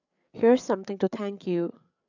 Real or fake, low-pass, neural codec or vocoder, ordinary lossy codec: fake; 7.2 kHz; codec, 16 kHz, 8 kbps, FreqCodec, larger model; none